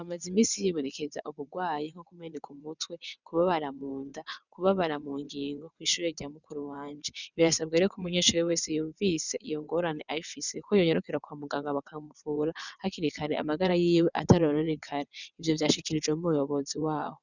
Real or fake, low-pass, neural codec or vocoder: fake; 7.2 kHz; vocoder, 22.05 kHz, 80 mel bands, WaveNeXt